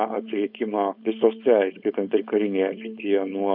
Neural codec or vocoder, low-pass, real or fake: codec, 16 kHz, 4.8 kbps, FACodec; 5.4 kHz; fake